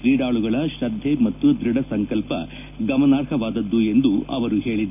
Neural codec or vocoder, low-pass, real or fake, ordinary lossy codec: none; 3.6 kHz; real; none